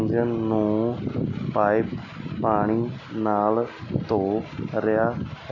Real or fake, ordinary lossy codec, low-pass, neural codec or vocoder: real; none; 7.2 kHz; none